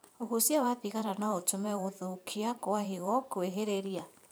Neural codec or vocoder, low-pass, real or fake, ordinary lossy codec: vocoder, 44.1 kHz, 128 mel bands every 512 samples, BigVGAN v2; none; fake; none